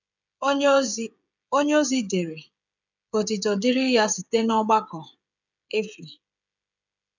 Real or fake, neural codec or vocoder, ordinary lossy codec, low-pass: fake; codec, 16 kHz, 16 kbps, FreqCodec, smaller model; none; 7.2 kHz